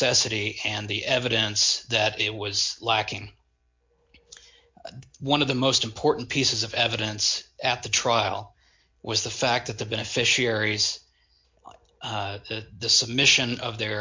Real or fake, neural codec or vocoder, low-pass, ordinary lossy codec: real; none; 7.2 kHz; MP3, 48 kbps